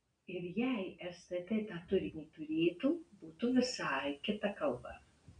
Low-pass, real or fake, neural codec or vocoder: 9.9 kHz; real; none